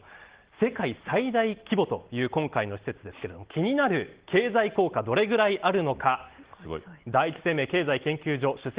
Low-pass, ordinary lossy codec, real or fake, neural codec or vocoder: 3.6 kHz; Opus, 16 kbps; real; none